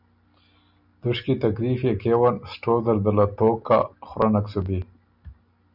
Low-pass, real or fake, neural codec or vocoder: 5.4 kHz; real; none